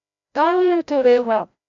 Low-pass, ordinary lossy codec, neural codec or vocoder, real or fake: 7.2 kHz; AAC, 64 kbps; codec, 16 kHz, 0.5 kbps, FreqCodec, larger model; fake